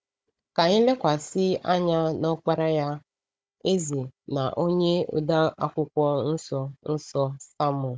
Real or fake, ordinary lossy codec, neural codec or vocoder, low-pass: fake; none; codec, 16 kHz, 16 kbps, FunCodec, trained on Chinese and English, 50 frames a second; none